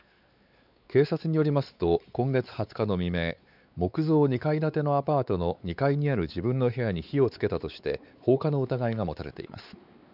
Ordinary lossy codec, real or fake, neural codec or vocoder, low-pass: none; fake; codec, 16 kHz, 4 kbps, X-Codec, WavLM features, trained on Multilingual LibriSpeech; 5.4 kHz